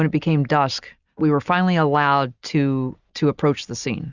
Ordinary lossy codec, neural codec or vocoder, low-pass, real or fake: Opus, 64 kbps; none; 7.2 kHz; real